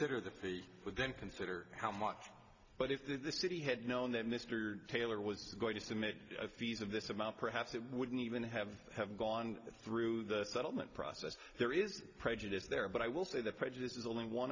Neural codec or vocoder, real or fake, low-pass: none; real; 7.2 kHz